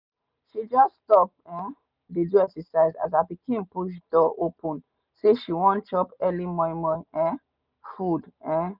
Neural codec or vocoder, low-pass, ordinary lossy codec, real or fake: none; 5.4 kHz; none; real